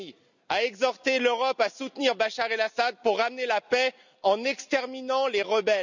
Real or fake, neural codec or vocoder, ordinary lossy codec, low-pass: real; none; none; 7.2 kHz